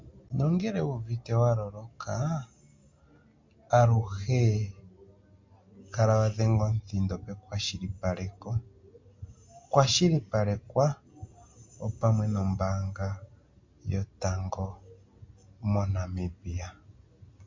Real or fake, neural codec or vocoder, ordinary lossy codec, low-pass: real; none; MP3, 48 kbps; 7.2 kHz